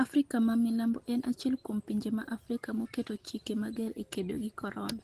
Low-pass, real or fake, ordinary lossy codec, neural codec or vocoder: 14.4 kHz; real; Opus, 16 kbps; none